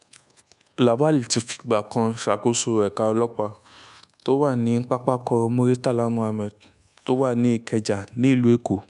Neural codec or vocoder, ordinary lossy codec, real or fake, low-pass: codec, 24 kHz, 1.2 kbps, DualCodec; none; fake; 10.8 kHz